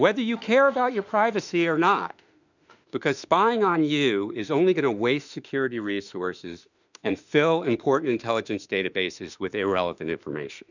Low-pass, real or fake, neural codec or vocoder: 7.2 kHz; fake; autoencoder, 48 kHz, 32 numbers a frame, DAC-VAE, trained on Japanese speech